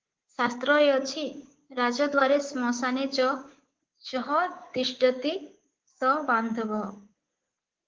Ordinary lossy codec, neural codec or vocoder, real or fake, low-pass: Opus, 16 kbps; codec, 24 kHz, 3.1 kbps, DualCodec; fake; 7.2 kHz